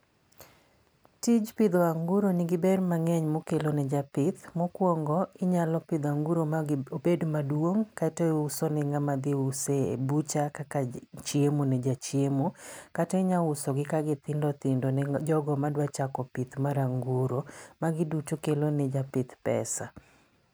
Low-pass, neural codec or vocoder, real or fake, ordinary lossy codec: none; none; real; none